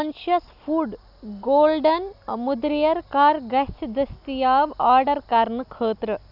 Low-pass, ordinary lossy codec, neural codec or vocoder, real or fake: 5.4 kHz; none; none; real